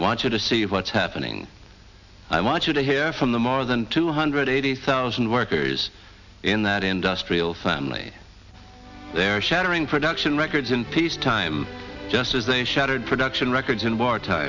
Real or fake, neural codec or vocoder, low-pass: real; none; 7.2 kHz